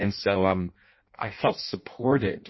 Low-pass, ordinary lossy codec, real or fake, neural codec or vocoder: 7.2 kHz; MP3, 24 kbps; fake; codec, 16 kHz in and 24 kHz out, 0.6 kbps, FireRedTTS-2 codec